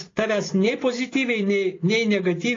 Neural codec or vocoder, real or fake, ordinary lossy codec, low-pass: none; real; AAC, 32 kbps; 7.2 kHz